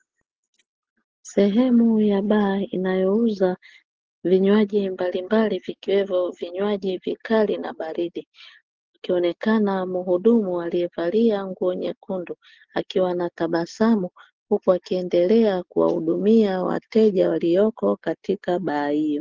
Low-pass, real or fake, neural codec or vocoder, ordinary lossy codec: 7.2 kHz; real; none; Opus, 16 kbps